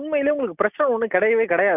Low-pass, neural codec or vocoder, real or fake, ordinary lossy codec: 3.6 kHz; none; real; none